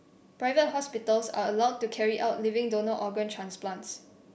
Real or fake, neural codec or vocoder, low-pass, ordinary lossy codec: real; none; none; none